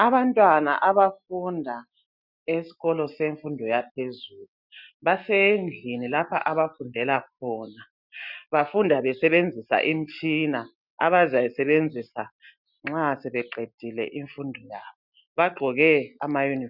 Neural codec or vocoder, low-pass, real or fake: none; 5.4 kHz; real